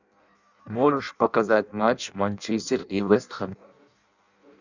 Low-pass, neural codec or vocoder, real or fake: 7.2 kHz; codec, 16 kHz in and 24 kHz out, 0.6 kbps, FireRedTTS-2 codec; fake